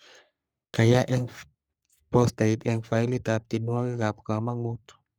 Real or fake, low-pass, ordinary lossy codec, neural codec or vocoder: fake; none; none; codec, 44.1 kHz, 3.4 kbps, Pupu-Codec